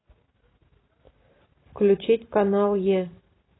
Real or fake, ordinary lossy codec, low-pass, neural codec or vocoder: real; AAC, 16 kbps; 7.2 kHz; none